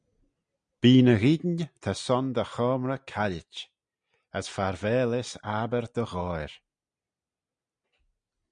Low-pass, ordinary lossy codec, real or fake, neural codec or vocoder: 9.9 kHz; MP3, 96 kbps; real; none